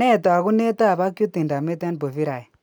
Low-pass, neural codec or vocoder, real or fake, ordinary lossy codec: none; none; real; none